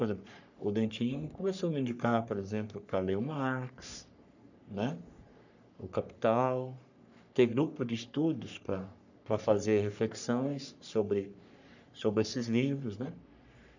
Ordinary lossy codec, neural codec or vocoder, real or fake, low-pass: none; codec, 44.1 kHz, 3.4 kbps, Pupu-Codec; fake; 7.2 kHz